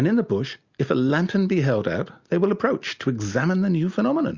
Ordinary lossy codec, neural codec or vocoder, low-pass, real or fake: Opus, 64 kbps; none; 7.2 kHz; real